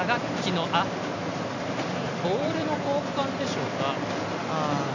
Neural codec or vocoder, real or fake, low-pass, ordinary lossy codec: none; real; 7.2 kHz; none